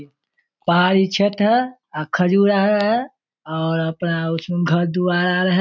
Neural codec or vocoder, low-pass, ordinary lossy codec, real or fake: none; none; none; real